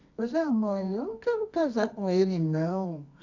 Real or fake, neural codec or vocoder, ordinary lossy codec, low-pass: fake; codec, 24 kHz, 0.9 kbps, WavTokenizer, medium music audio release; AAC, 48 kbps; 7.2 kHz